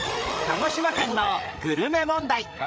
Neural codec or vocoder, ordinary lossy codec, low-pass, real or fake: codec, 16 kHz, 8 kbps, FreqCodec, larger model; none; none; fake